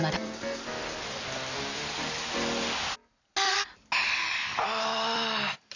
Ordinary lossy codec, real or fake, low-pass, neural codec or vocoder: none; real; 7.2 kHz; none